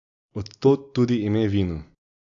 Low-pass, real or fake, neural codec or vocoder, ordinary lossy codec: 7.2 kHz; real; none; AAC, 64 kbps